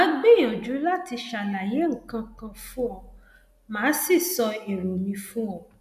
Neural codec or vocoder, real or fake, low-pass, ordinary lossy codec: none; real; 14.4 kHz; none